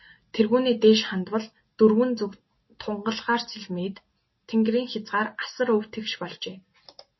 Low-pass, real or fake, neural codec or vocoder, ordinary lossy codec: 7.2 kHz; real; none; MP3, 24 kbps